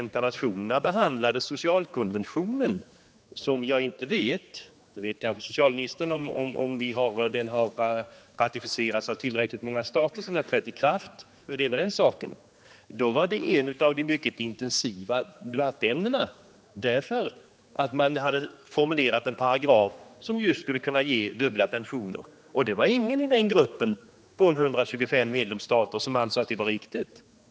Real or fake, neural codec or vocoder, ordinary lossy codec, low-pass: fake; codec, 16 kHz, 2 kbps, X-Codec, HuBERT features, trained on general audio; none; none